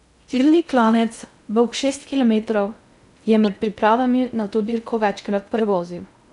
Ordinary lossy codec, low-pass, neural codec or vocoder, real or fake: none; 10.8 kHz; codec, 16 kHz in and 24 kHz out, 0.6 kbps, FocalCodec, streaming, 2048 codes; fake